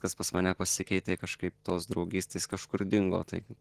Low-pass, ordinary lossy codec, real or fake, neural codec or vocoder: 14.4 kHz; Opus, 16 kbps; fake; vocoder, 44.1 kHz, 128 mel bands every 512 samples, BigVGAN v2